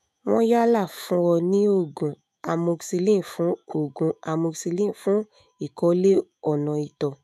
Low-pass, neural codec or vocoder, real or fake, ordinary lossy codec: 14.4 kHz; autoencoder, 48 kHz, 128 numbers a frame, DAC-VAE, trained on Japanese speech; fake; none